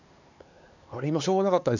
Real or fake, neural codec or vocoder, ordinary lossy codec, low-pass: fake; codec, 16 kHz, 4 kbps, X-Codec, HuBERT features, trained on LibriSpeech; none; 7.2 kHz